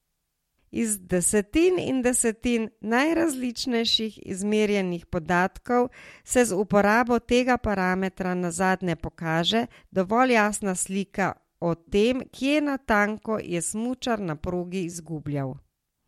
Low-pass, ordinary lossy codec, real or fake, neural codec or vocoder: 19.8 kHz; MP3, 64 kbps; real; none